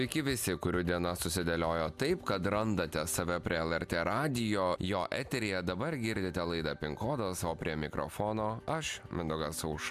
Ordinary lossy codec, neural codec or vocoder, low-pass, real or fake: MP3, 96 kbps; none; 14.4 kHz; real